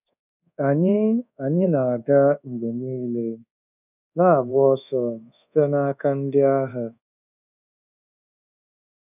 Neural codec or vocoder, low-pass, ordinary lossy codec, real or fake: codec, 24 kHz, 1.2 kbps, DualCodec; 3.6 kHz; none; fake